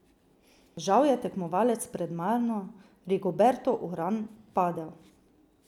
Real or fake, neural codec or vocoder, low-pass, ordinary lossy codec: real; none; 19.8 kHz; none